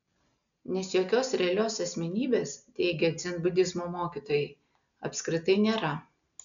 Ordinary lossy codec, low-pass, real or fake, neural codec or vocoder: MP3, 96 kbps; 7.2 kHz; real; none